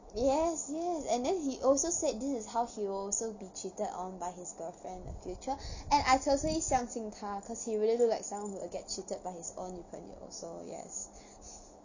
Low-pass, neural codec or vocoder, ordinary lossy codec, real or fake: 7.2 kHz; none; none; real